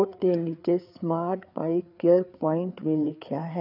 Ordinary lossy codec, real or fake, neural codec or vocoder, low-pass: none; fake; codec, 16 kHz, 4 kbps, FreqCodec, larger model; 5.4 kHz